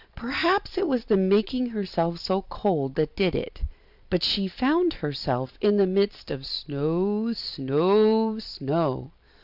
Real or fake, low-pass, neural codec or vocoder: fake; 5.4 kHz; vocoder, 44.1 kHz, 128 mel bands every 256 samples, BigVGAN v2